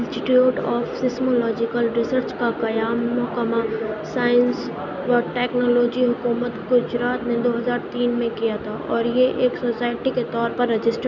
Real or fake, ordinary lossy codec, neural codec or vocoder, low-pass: real; none; none; 7.2 kHz